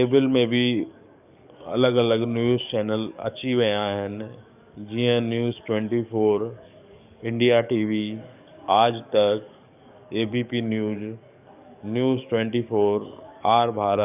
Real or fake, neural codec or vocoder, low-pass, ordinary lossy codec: fake; codec, 44.1 kHz, 7.8 kbps, DAC; 3.6 kHz; none